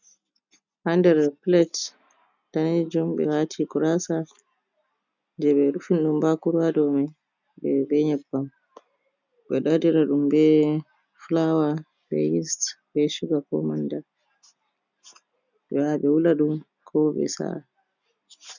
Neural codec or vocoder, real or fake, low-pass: none; real; 7.2 kHz